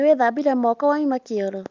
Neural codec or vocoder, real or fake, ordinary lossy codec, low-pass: none; real; Opus, 32 kbps; 7.2 kHz